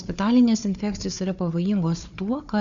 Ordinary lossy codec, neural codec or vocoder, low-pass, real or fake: MP3, 96 kbps; codec, 16 kHz, 4 kbps, FunCodec, trained on Chinese and English, 50 frames a second; 7.2 kHz; fake